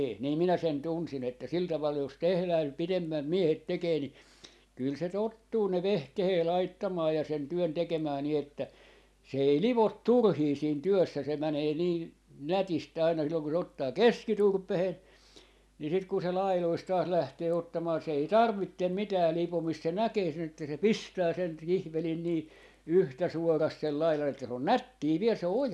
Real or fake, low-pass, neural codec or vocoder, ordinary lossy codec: real; none; none; none